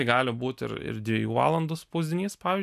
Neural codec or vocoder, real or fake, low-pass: none; real; 14.4 kHz